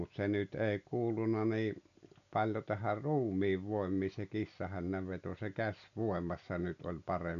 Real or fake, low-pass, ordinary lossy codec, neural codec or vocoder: real; 7.2 kHz; none; none